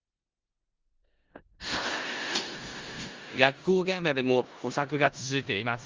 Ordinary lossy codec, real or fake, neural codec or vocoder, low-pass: Opus, 32 kbps; fake; codec, 16 kHz in and 24 kHz out, 0.4 kbps, LongCat-Audio-Codec, four codebook decoder; 7.2 kHz